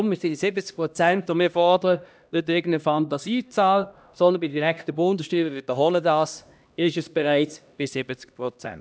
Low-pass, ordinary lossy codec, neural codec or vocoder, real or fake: none; none; codec, 16 kHz, 1 kbps, X-Codec, HuBERT features, trained on LibriSpeech; fake